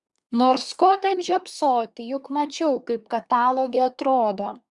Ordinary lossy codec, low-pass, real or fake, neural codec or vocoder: Opus, 64 kbps; 10.8 kHz; fake; codec, 24 kHz, 1 kbps, SNAC